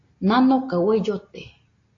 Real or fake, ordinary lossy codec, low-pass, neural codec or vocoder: real; AAC, 32 kbps; 7.2 kHz; none